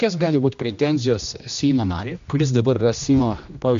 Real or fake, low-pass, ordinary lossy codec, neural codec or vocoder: fake; 7.2 kHz; MP3, 64 kbps; codec, 16 kHz, 1 kbps, X-Codec, HuBERT features, trained on general audio